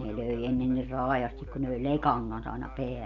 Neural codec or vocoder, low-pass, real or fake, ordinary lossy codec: none; 7.2 kHz; real; none